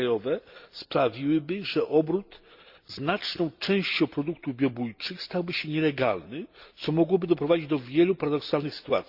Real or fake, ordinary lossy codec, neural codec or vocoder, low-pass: real; Opus, 64 kbps; none; 5.4 kHz